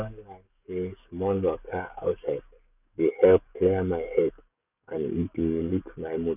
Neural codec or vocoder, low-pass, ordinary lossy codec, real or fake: codec, 44.1 kHz, 7.8 kbps, DAC; 3.6 kHz; none; fake